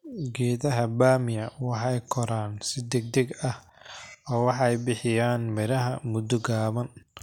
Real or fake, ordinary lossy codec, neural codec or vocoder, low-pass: real; none; none; 19.8 kHz